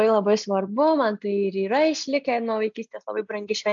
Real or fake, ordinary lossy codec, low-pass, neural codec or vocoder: real; AAC, 64 kbps; 7.2 kHz; none